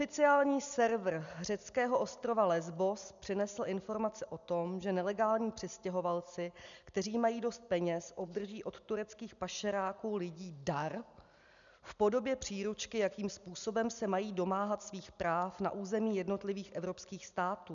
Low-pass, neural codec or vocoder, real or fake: 7.2 kHz; none; real